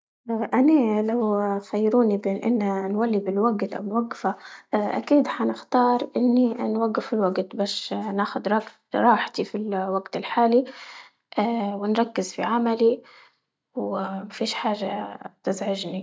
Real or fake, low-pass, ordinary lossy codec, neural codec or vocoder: real; none; none; none